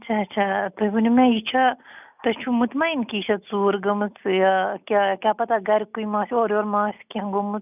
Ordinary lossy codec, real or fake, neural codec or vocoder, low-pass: none; real; none; 3.6 kHz